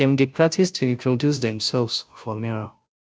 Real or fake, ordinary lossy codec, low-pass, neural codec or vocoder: fake; none; none; codec, 16 kHz, 0.5 kbps, FunCodec, trained on Chinese and English, 25 frames a second